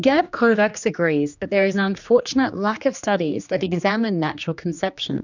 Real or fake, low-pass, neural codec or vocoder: fake; 7.2 kHz; codec, 16 kHz, 2 kbps, X-Codec, HuBERT features, trained on general audio